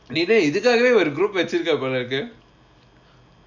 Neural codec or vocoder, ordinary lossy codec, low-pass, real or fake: none; none; 7.2 kHz; real